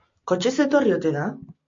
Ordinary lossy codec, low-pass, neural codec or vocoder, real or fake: MP3, 48 kbps; 7.2 kHz; none; real